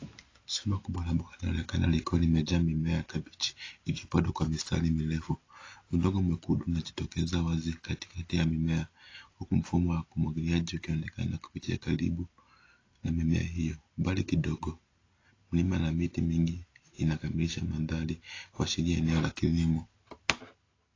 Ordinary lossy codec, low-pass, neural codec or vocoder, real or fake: AAC, 32 kbps; 7.2 kHz; none; real